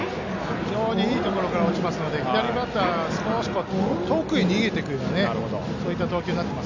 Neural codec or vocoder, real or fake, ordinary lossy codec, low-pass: none; real; none; 7.2 kHz